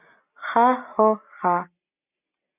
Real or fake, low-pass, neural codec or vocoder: real; 3.6 kHz; none